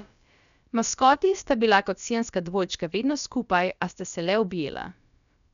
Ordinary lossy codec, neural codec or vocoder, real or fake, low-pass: none; codec, 16 kHz, about 1 kbps, DyCAST, with the encoder's durations; fake; 7.2 kHz